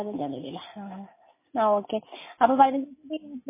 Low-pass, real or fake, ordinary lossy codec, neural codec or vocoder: 3.6 kHz; real; MP3, 16 kbps; none